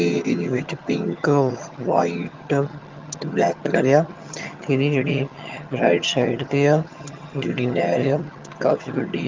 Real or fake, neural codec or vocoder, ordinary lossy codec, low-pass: fake; vocoder, 22.05 kHz, 80 mel bands, HiFi-GAN; Opus, 24 kbps; 7.2 kHz